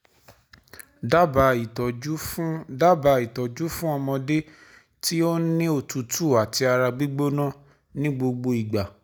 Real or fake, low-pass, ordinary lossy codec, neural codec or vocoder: real; none; none; none